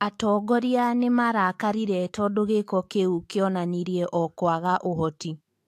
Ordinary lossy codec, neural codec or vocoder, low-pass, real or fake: AAC, 64 kbps; autoencoder, 48 kHz, 128 numbers a frame, DAC-VAE, trained on Japanese speech; 14.4 kHz; fake